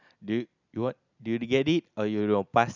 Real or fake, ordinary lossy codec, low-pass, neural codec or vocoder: real; none; 7.2 kHz; none